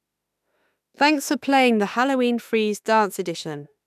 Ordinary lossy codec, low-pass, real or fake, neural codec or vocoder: none; 14.4 kHz; fake; autoencoder, 48 kHz, 32 numbers a frame, DAC-VAE, trained on Japanese speech